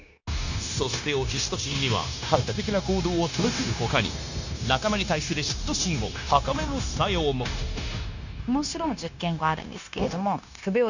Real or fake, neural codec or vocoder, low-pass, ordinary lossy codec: fake; codec, 16 kHz, 0.9 kbps, LongCat-Audio-Codec; 7.2 kHz; none